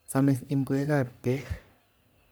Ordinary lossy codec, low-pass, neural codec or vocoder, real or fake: none; none; codec, 44.1 kHz, 3.4 kbps, Pupu-Codec; fake